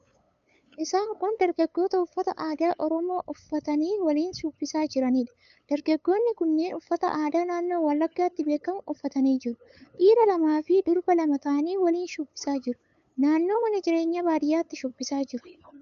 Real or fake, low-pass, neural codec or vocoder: fake; 7.2 kHz; codec, 16 kHz, 8 kbps, FunCodec, trained on LibriTTS, 25 frames a second